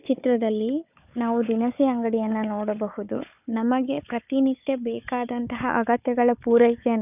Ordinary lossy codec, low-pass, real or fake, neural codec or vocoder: none; 3.6 kHz; fake; codec, 16 kHz, 4 kbps, FunCodec, trained on Chinese and English, 50 frames a second